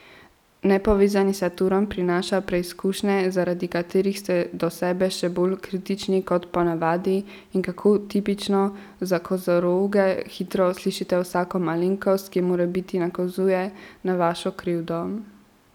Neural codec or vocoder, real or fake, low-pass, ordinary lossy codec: none; real; 19.8 kHz; none